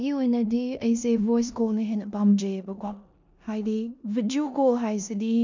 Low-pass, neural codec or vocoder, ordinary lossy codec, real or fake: 7.2 kHz; codec, 16 kHz in and 24 kHz out, 0.9 kbps, LongCat-Audio-Codec, four codebook decoder; MP3, 64 kbps; fake